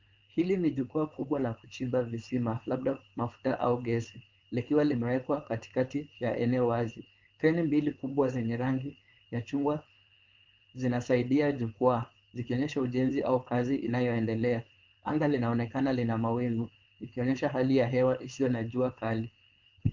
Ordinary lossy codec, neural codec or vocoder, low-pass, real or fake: Opus, 32 kbps; codec, 16 kHz, 4.8 kbps, FACodec; 7.2 kHz; fake